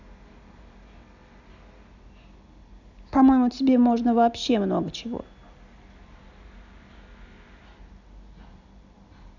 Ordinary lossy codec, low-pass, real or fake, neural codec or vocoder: none; 7.2 kHz; real; none